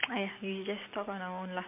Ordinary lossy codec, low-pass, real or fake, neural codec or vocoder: MP3, 32 kbps; 3.6 kHz; real; none